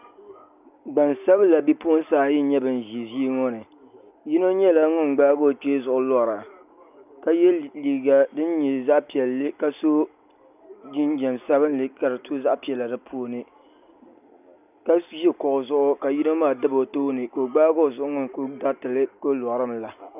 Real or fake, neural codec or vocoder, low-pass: real; none; 3.6 kHz